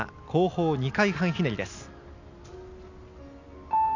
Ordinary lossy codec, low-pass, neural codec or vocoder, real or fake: none; 7.2 kHz; none; real